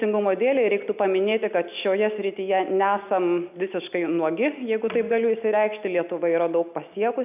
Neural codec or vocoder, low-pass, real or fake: none; 3.6 kHz; real